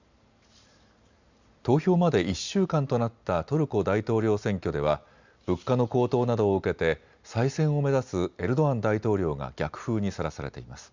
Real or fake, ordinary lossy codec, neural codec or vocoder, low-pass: real; Opus, 64 kbps; none; 7.2 kHz